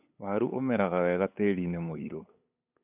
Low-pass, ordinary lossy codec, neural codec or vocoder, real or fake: 3.6 kHz; none; codec, 16 kHz, 8 kbps, FunCodec, trained on LibriTTS, 25 frames a second; fake